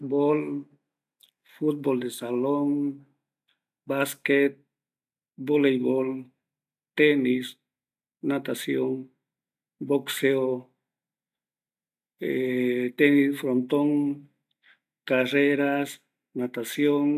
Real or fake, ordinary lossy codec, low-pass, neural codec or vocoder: real; none; 14.4 kHz; none